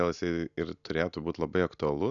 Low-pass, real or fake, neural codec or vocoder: 7.2 kHz; real; none